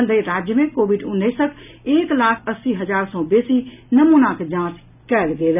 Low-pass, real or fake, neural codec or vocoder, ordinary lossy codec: 3.6 kHz; real; none; none